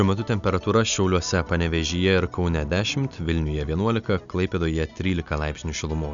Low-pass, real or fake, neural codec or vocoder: 7.2 kHz; real; none